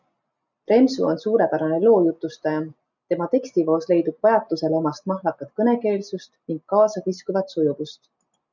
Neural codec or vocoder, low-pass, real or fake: none; 7.2 kHz; real